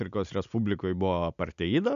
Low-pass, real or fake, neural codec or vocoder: 7.2 kHz; real; none